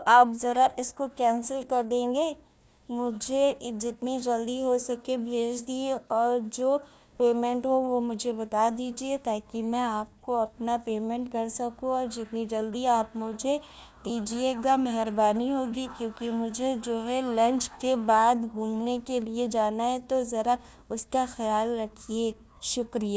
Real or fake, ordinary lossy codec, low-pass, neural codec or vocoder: fake; none; none; codec, 16 kHz, 1 kbps, FunCodec, trained on Chinese and English, 50 frames a second